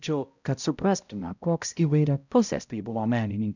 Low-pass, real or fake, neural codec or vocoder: 7.2 kHz; fake; codec, 16 kHz, 0.5 kbps, X-Codec, HuBERT features, trained on balanced general audio